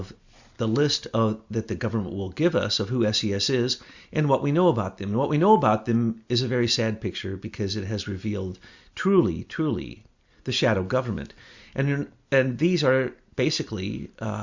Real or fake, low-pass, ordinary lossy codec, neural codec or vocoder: real; 7.2 kHz; Opus, 64 kbps; none